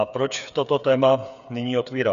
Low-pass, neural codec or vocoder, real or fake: 7.2 kHz; codec, 16 kHz, 8 kbps, FreqCodec, smaller model; fake